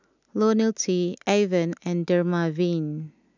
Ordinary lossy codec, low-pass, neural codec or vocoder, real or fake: none; 7.2 kHz; none; real